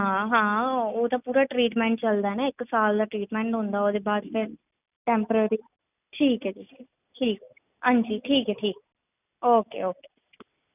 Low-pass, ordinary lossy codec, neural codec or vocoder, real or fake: 3.6 kHz; none; none; real